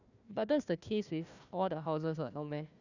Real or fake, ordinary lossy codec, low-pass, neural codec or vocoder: fake; none; 7.2 kHz; codec, 16 kHz, 1 kbps, FunCodec, trained on Chinese and English, 50 frames a second